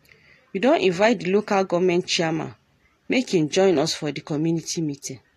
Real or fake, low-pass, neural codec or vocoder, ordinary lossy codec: real; 14.4 kHz; none; AAC, 48 kbps